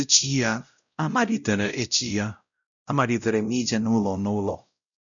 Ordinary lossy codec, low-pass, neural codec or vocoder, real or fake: none; 7.2 kHz; codec, 16 kHz, 0.5 kbps, X-Codec, WavLM features, trained on Multilingual LibriSpeech; fake